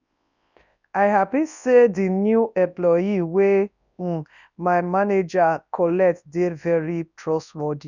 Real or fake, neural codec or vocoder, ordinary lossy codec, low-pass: fake; codec, 24 kHz, 0.9 kbps, WavTokenizer, large speech release; none; 7.2 kHz